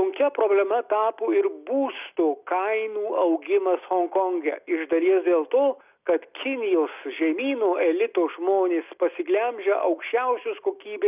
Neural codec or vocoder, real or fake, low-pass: none; real; 3.6 kHz